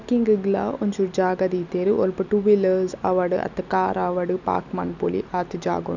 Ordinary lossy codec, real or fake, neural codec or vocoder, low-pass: none; real; none; 7.2 kHz